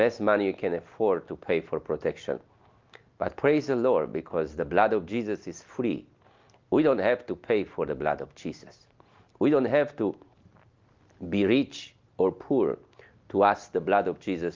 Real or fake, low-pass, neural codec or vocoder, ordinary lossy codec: real; 7.2 kHz; none; Opus, 24 kbps